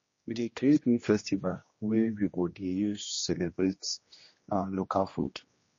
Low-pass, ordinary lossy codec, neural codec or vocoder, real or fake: 7.2 kHz; MP3, 32 kbps; codec, 16 kHz, 1 kbps, X-Codec, HuBERT features, trained on general audio; fake